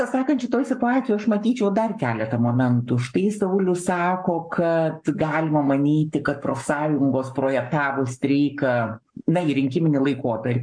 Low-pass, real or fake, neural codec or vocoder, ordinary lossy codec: 9.9 kHz; fake; codec, 44.1 kHz, 7.8 kbps, Pupu-Codec; MP3, 64 kbps